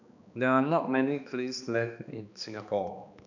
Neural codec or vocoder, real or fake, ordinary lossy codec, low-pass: codec, 16 kHz, 2 kbps, X-Codec, HuBERT features, trained on balanced general audio; fake; none; 7.2 kHz